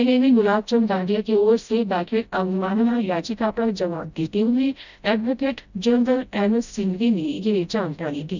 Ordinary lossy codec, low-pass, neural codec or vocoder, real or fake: none; 7.2 kHz; codec, 16 kHz, 0.5 kbps, FreqCodec, smaller model; fake